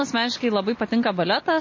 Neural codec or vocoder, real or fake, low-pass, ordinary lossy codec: none; real; 7.2 kHz; MP3, 32 kbps